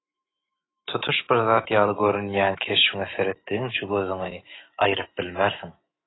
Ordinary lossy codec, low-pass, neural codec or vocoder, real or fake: AAC, 16 kbps; 7.2 kHz; autoencoder, 48 kHz, 128 numbers a frame, DAC-VAE, trained on Japanese speech; fake